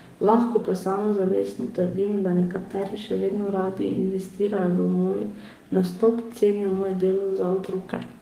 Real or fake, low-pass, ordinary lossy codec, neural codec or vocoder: fake; 14.4 kHz; Opus, 24 kbps; codec, 32 kHz, 1.9 kbps, SNAC